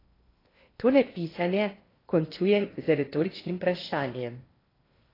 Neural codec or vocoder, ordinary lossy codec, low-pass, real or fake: codec, 16 kHz in and 24 kHz out, 0.8 kbps, FocalCodec, streaming, 65536 codes; AAC, 24 kbps; 5.4 kHz; fake